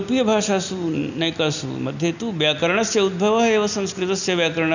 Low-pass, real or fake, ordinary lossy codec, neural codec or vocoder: 7.2 kHz; real; none; none